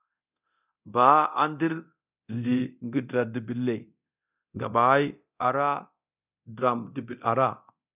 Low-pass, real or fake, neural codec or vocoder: 3.6 kHz; fake; codec, 24 kHz, 0.9 kbps, DualCodec